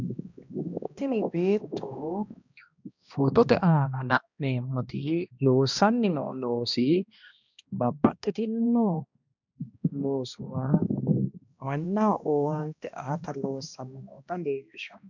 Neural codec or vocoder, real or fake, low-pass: codec, 16 kHz, 1 kbps, X-Codec, HuBERT features, trained on balanced general audio; fake; 7.2 kHz